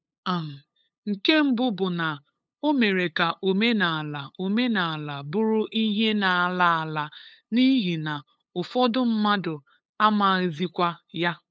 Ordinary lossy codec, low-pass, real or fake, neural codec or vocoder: none; none; fake; codec, 16 kHz, 8 kbps, FunCodec, trained on LibriTTS, 25 frames a second